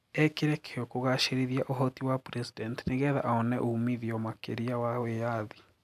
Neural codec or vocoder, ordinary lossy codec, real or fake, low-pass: none; none; real; 14.4 kHz